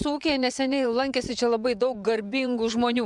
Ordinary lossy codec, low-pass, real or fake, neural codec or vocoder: MP3, 96 kbps; 10.8 kHz; fake; vocoder, 24 kHz, 100 mel bands, Vocos